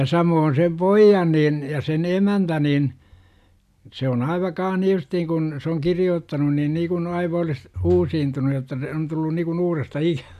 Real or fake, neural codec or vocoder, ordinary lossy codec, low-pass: real; none; none; 14.4 kHz